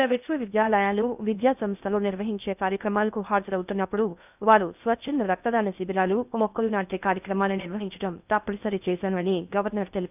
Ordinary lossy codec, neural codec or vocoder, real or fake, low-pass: none; codec, 16 kHz in and 24 kHz out, 0.6 kbps, FocalCodec, streaming, 2048 codes; fake; 3.6 kHz